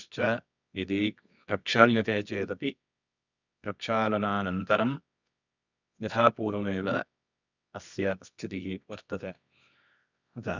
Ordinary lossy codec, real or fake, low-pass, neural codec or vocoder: none; fake; 7.2 kHz; codec, 24 kHz, 0.9 kbps, WavTokenizer, medium music audio release